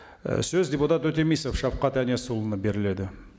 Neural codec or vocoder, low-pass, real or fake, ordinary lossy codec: none; none; real; none